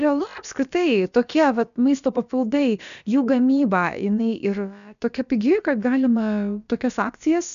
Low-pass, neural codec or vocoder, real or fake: 7.2 kHz; codec, 16 kHz, about 1 kbps, DyCAST, with the encoder's durations; fake